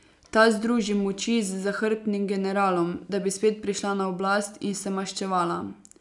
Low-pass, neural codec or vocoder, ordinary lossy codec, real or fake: 10.8 kHz; none; none; real